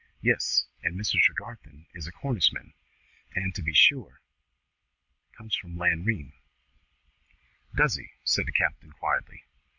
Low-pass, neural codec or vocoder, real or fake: 7.2 kHz; none; real